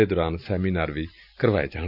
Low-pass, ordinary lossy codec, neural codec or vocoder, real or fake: 5.4 kHz; none; none; real